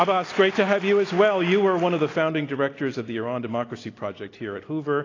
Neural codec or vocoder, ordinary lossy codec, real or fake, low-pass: none; AAC, 32 kbps; real; 7.2 kHz